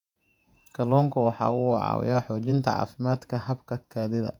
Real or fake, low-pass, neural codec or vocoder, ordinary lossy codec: fake; 19.8 kHz; vocoder, 48 kHz, 128 mel bands, Vocos; none